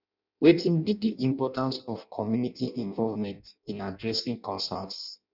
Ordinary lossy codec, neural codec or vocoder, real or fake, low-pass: none; codec, 16 kHz in and 24 kHz out, 0.6 kbps, FireRedTTS-2 codec; fake; 5.4 kHz